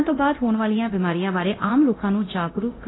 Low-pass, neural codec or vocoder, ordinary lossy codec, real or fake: 7.2 kHz; codec, 24 kHz, 0.9 kbps, DualCodec; AAC, 16 kbps; fake